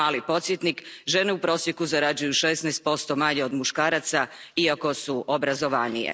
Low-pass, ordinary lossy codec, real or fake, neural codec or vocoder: none; none; real; none